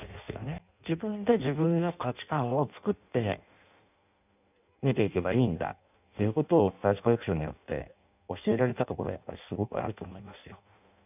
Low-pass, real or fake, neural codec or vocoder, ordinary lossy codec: 3.6 kHz; fake; codec, 16 kHz in and 24 kHz out, 0.6 kbps, FireRedTTS-2 codec; none